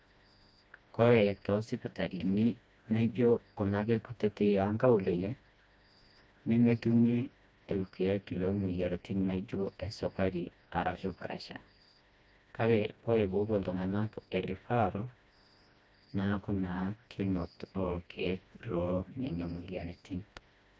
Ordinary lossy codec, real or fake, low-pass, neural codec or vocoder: none; fake; none; codec, 16 kHz, 1 kbps, FreqCodec, smaller model